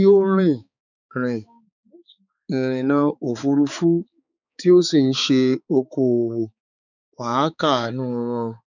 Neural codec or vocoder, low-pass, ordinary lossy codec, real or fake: codec, 16 kHz, 4 kbps, X-Codec, HuBERT features, trained on balanced general audio; 7.2 kHz; none; fake